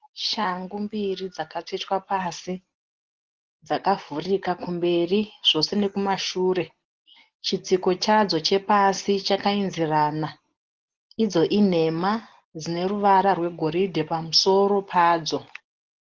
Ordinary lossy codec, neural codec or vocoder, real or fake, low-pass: Opus, 16 kbps; none; real; 7.2 kHz